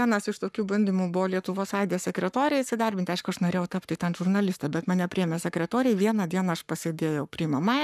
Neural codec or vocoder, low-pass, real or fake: codec, 44.1 kHz, 7.8 kbps, Pupu-Codec; 14.4 kHz; fake